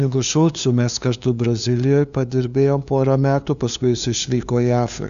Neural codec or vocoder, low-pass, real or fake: codec, 16 kHz, 2 kbps, FunCodec, trained on LibriTTS, 25 frames a second; 7.2 kHz; fake